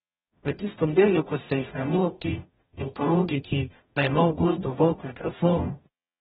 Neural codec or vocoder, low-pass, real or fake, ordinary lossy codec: codec, 44.1 kHz, 0.9 kbps, DAC; 19.8 kHz; fake; AAC, 16 kbps